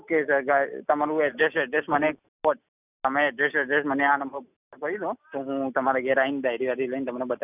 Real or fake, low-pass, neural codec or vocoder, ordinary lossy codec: real; 3.6 kHz; none; none